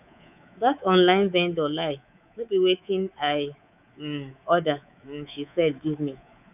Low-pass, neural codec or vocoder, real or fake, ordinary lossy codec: 3.6 kHz; codec, 24 kHz, 3.1 kbps, DualCodec; fake; none